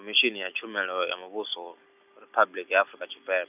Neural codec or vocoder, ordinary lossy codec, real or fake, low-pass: none; none; real; 3.6 kHz